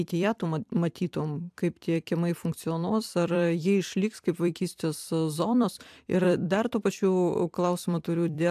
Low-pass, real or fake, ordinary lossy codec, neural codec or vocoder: 14.4 kHz; fake; AAC, 96 kbps; vocoder, 44.1 kHz, 128 mel bands every 256 samples, BigVGAN v2